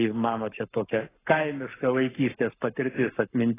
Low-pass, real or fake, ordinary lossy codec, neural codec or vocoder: 3.6 kHz; fake; AAC, 16 kbps; codec, 44.1 kHz, 7.8 kbps, Pupu-Codec